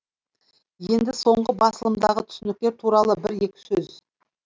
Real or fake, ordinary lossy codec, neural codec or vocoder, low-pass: real; none; none; none